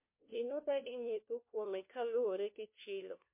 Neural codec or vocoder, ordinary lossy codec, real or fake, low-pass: codec, 16 kHz, 1 kbps, FunCodec, trained on LibriTTS, 50 frames a second; MP3, 24 kbps; fake; 3.6 kHz